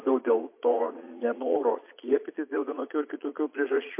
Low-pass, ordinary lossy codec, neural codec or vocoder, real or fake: 3.6 kHz; MP3, 24 kbps; vocoder, 22.05 kHz, 80 mel bands, Vocos; fake